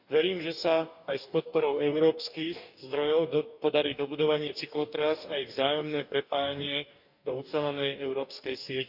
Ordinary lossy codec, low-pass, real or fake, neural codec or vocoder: none; 5.4 kHz; fake; codec, 44.1 kHz, 2.6 kbps, DAC